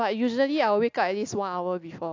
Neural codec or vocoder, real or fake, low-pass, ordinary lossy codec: none; real; 7.2 kHz; AAC, 48 kbps